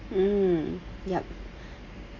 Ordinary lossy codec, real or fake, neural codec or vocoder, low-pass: AAC, 32 kbps; real; none; 7.2 kHz